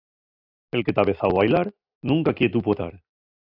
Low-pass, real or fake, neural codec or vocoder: 5.4 kHz; real; none